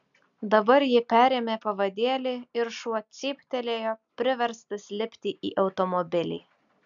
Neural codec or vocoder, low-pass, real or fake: none; 7.2 kHz; real